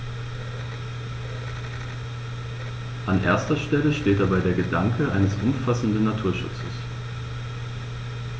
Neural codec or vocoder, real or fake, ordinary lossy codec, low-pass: none; real; none; none